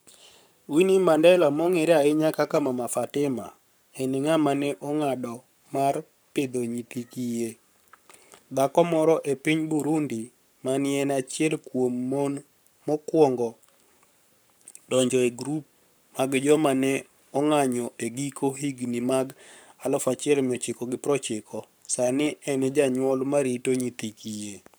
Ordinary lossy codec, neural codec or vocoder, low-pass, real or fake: none; codec, 44.1 kHz, 7.8 kbps, Pupu-Codec; none; fake